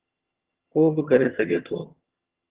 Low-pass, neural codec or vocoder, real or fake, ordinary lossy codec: 3.6 kHz; vocoder, 22.05 kHz, 80 mel bands, HiFi-GAN; fake; Opus, 16 kbps